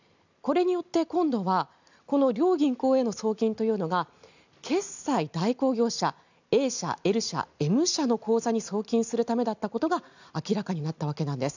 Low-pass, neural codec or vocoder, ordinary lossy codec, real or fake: 7.2 kHz; none; none; real